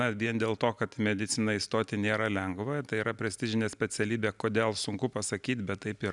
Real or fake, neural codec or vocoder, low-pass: real; none; 10.8 kHz